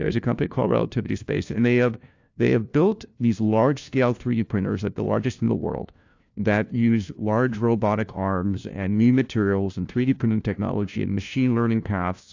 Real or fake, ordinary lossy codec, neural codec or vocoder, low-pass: fake; AAC, 48 kbps; codec, 16 kHz, 1 kbps, FunCodec, trained on LibriTTS, 50 frames a second; 7.2 kHz